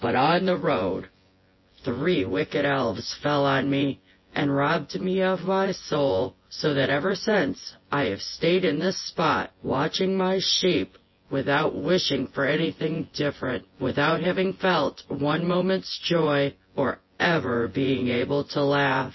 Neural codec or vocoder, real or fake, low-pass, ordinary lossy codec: vocoder, 24 kHz, 100 mel bands, Vocos; fake; 7.2 kHz; MP3, 24 kbps